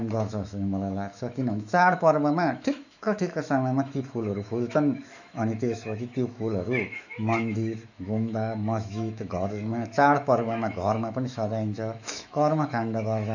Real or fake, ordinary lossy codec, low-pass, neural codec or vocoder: fake; none; 7.2 kHz; autoencoder, 48 kHz, 128 numbers a frame, DAC-VAE, trained on Japanese speech